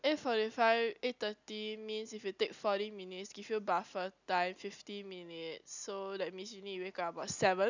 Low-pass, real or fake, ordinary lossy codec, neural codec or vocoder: 7.2 kHz; real; none; none